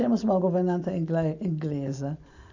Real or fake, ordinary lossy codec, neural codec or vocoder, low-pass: real; none; none; 7.2 kHz